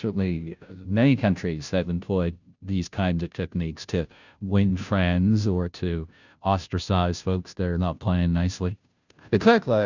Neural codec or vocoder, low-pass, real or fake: codec, 16 kHz, 0.5 kbps, FunCodec, trained on Chinese and English, 25 frames a second; 7.2 kHz; fake